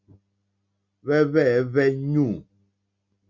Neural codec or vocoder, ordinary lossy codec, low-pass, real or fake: none; Opus, 64 kbps; 7.2 kHz; real